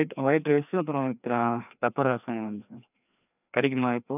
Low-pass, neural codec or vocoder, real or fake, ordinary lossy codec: 3.6 kHz; codec, 16 kHz, 2 kbps, FreqCodec, larger model; fake; none